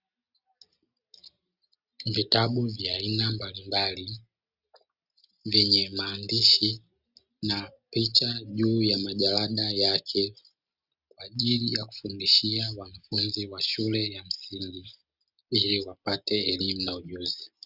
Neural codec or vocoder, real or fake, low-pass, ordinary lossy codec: none; real; 5.4 kHz; Opus, 64 kbps